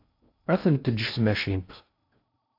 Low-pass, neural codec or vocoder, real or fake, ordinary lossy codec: 5.4 kHz; codec, 16 kHz in and 24 kHz out, 0.6 kbps, FocalCodec, streaming, 2048 codes; fake; AAC, 32 kbps